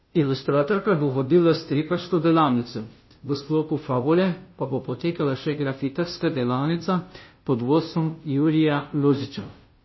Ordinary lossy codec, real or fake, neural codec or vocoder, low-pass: MP3, 24 kbps; fake; codec, 16 kHz, 0.5 kbps, FunCodec, trained on Chinese and English, 25 frames a second; 7.2 kHz